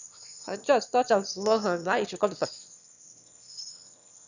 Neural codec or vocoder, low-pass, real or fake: autoencoder, 22.05 kHz, a latent of 192 numbers a frame, VITS, trained on one speaker; 7.2 kHz; fake